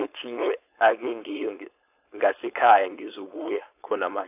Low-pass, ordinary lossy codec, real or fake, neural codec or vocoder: 3.6 kHz; AAC, 32 kbps; fake; codec, 16 kHz, 4.8 kbps, FACodec